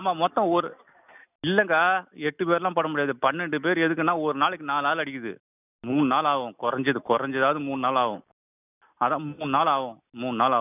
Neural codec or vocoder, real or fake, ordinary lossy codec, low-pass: none; real; none; 3.6 kHz